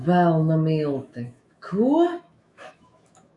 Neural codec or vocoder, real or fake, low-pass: autoencoder, 48 kHz, 128 numbers a frame, DAC-VAE, trained on Japanese speech; fake; 10.8 kHz